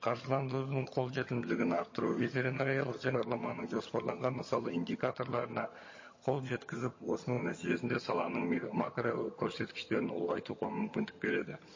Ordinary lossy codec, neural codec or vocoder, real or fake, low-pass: MP3, 32 kbps; vocoder, 22.05 kHz, 80 mel bands, HiFi-GAN; fake; 7.2 kHz